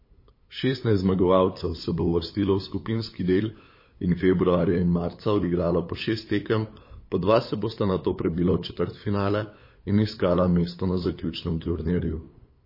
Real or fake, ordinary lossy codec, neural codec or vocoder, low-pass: fake; MP3, 24 kbps; codec, 16 kHz, 8 kbps, FunCodec, trained on LibriTTS, 25 frames a second; 5.4 kHz